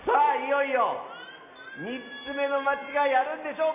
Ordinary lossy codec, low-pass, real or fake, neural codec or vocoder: none; 3.6 kHz; real; none